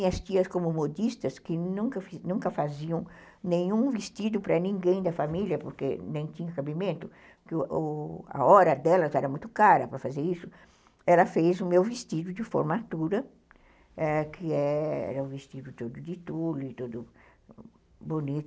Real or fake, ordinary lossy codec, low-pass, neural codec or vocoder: real; none; none; none